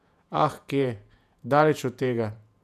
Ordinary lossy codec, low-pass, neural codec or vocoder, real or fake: none; 14.4 kHz; vocoder, 48 kHz, 128 mel bands, Vocos; fake